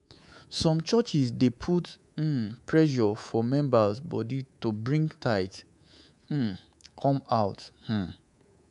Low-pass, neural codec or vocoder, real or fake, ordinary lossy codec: 10.8 kHz; codec, 24 kHz, 3.1 kbps, DualCodec; fake; MP3, 96 kbps